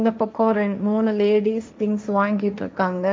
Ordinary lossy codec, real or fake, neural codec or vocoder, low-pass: none; fake; codec, 16 kHz, 1.1 kbps, Voila-Tokenizer; none